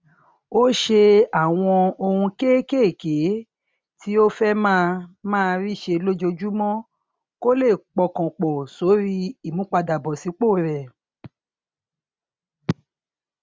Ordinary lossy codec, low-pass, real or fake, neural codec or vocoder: none; none; real; none